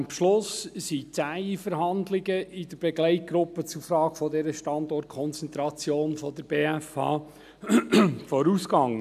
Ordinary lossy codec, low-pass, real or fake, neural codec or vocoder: none; 14.4 kHz; real; none